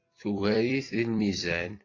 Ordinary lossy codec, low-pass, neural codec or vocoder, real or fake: AAC, 32 kbps; 7.2 kHz; vocoder, 44.1 kHz, 128 mel bands every 256 samples, BigVGAN v2; fake